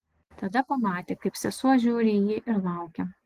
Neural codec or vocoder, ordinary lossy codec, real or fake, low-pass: none; Opus, 16 kbps; real; 14.4 kHz